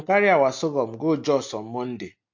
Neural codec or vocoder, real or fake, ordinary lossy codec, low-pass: none; real; MP3, 48 kbps; 7.2 kHz